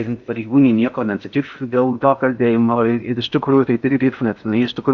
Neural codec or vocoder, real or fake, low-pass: codec, 16 kHz in and 24 kHz out, 0.6 kbps, FocalCodec, streaming, 4096 codes; fake; 7.2 kHz